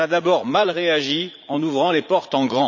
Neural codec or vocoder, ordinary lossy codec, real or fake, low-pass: vocoder, 44.1 kHz, 80 mel bands, Vocos; none; fake; 7.2 kHz